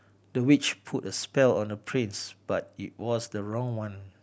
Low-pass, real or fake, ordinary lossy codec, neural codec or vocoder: none; real; none; none